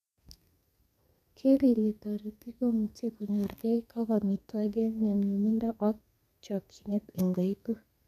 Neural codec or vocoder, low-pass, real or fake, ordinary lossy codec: codec, 32 kHz, 1.9 kbps, SNAC; 14.4 kHz; fake; none